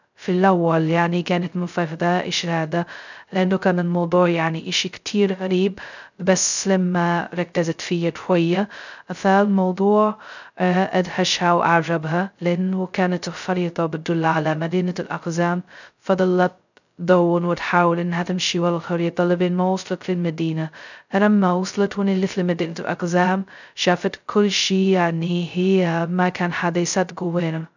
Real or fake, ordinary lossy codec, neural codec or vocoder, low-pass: fake; none; codec, 16 kHz, 0.2 kbps, FocalCodec; 7.2 kHz